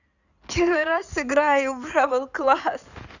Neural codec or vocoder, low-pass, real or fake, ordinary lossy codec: codec, 16 kHz in and 24 kHz out, 2.2 kbps, FireRedTTS-2 codec; 7.2 kHz; fake; none